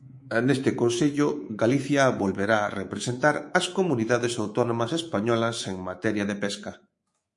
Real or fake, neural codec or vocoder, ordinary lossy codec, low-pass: fake; codec, 24 kHz, 3.1 kbps, DualCodec; MP3, 48 kbps; 10.8 kHz